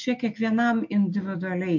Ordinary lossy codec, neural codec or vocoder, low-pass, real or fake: MP3, 48 kbps; none; 7.2 kHz; real